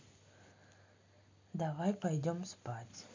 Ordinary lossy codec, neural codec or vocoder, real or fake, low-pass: MP3, 48 kbps; none; real; 7.2 kHz